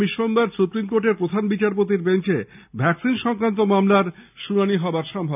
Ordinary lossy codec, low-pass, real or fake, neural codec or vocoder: none; 3.6 kHz; real; none